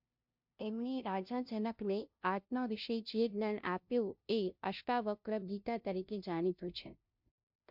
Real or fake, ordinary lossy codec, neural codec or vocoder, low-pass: fake; MP3, 48 kbps; codec, 16 kHz, 0.5 kbps, FunCodec, trained on LibriTTS, 25 frames a second; 5.4 kHz